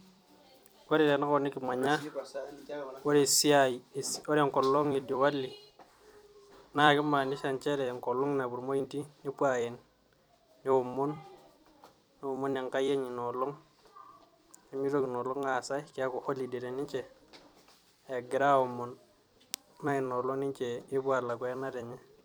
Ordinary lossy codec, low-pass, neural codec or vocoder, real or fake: none; none; vocoder, 44.1 kHz, 128 mel bands every 256 samples, BigVGAN v2; fake